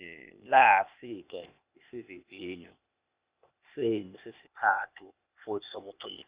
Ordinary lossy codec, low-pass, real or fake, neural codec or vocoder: Opus, 64 kbps; 3.6 kHz; fake; codec, 16 kHz, 0.8 kbps, ZipCodec